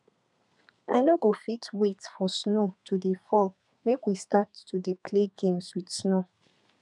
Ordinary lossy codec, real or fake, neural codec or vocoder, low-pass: none; fake; codec, 32 kHz, 1.9 kbps, SNAC; 10.8 kHz